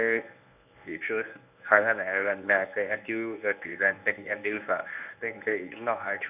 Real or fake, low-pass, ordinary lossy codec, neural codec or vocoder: fake; 3.6 kHz; none; codec, 24 kHz, 0.9 kbps, WavTokenizer, medium speech release version 1